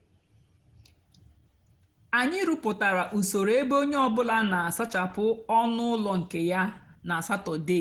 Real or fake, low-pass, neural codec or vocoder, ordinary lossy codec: real; 19.8 kHz; none; Opus, 16 kbps